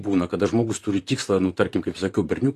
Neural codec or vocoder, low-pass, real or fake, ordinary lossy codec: vocoder, 44.1 kHz, 128 mel bands, Pupu-Vocoder; 14.4 kHz; fake; AAC, 48 kbps